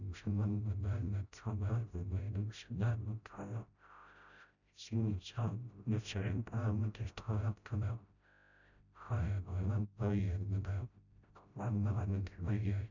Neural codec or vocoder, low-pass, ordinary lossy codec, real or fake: codec, 16 kHz, 0.5 kbps, FreqCodec, smaller model; 7.2 kHz; none; fake